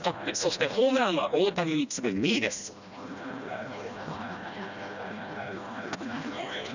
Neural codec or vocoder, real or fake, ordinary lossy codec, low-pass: codec, 16 kHz, 1 kbps, FreqCodec, smaller model; fake; none; 7.2 kHz